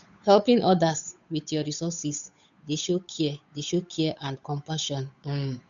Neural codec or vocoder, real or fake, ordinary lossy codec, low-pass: codec, 16 kHz, 8 kbps, FunCodec, trained on Chinese and English, 25 frames a second; fake; none; 7.2 kHz